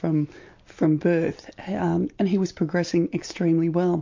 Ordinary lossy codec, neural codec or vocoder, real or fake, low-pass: MP3, 48 kbps; none; real; 7.2 kHz